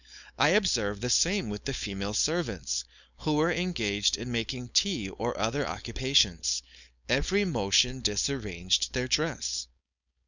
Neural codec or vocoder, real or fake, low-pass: codec, 16 kHz, 4.8 kbps, FACodec; fake; 7.2 kHz